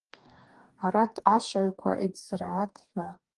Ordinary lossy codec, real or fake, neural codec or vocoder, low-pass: Opus, 24 kbps; fake; codec, 44.1 kHz, 2.6 kbps, DAC; 10.8 kHz